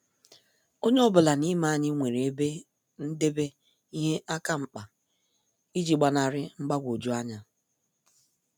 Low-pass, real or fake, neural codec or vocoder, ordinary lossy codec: none; real; none; none